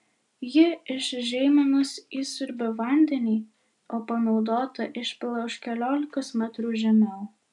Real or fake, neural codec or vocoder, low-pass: real; none; 10.8 kHz